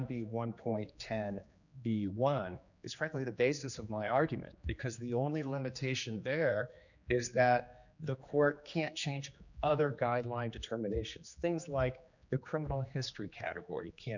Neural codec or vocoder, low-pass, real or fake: codec, 16 kHz, 2 kbps, X-Codec, HuBERT features, trained on general audio; 7.2 kHz; fake